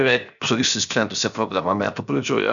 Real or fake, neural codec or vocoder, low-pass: fake; codec, 16 kHz, 0.7 kbps, FocalCodec; 7.2 kHz